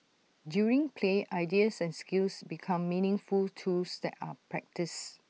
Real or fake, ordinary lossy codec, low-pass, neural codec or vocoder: real; none; none; none